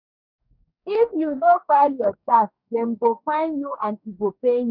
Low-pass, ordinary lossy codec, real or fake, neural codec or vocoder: 5.4 kHz; none; fake; codec, 44.1 kHz, 2.6 kbps, DAC